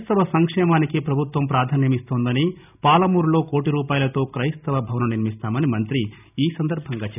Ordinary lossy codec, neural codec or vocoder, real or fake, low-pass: none; none; real; 3.6 kHz